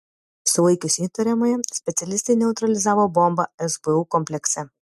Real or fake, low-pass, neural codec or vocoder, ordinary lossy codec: real; 14.4 kHz; none; MP3, 64 kbps